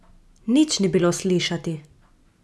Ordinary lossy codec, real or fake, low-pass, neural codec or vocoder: none; real; none; none